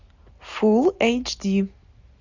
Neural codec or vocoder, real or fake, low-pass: none; real; 7.2 kHz